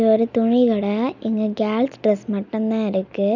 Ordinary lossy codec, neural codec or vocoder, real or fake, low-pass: none; none; real; 7.2 kHz